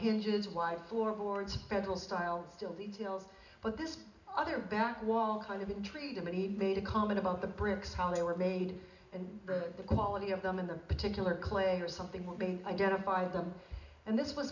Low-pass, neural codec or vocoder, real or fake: 7.2 kHz; none; real